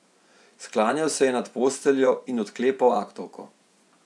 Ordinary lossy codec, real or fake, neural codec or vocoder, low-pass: none; fake; vocoder, 24 kHz, 100 mel bands, Vocos; none